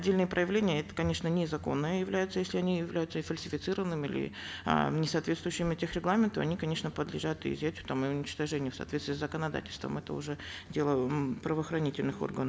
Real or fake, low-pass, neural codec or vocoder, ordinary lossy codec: real; none; none; none